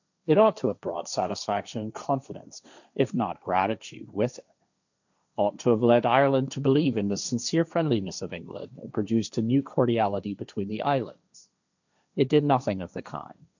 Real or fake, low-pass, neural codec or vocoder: fake; 7.2 kHz; codec, 16 kHz, 1.1 kbps, Voila-Tokenizer